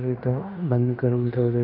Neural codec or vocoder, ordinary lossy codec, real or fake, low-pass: codec, 16 kHz in and 24 kHz out, 0.9 kbps, LongCat-Audio-Codec, fine tuned four codebook decoder; none; fake; 5.4 kHz